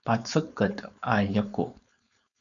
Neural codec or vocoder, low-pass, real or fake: codec, 16 kHz, 4.8 kbps, FACodec; 7.2 kHz; fake